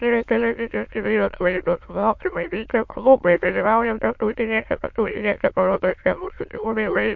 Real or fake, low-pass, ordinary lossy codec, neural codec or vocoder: fake; 7.2 kHz; MP3, 48 kbps; autoencoder, 22.05 kHz, a latent of 192 numbers a frame, VITS, trained on many speakers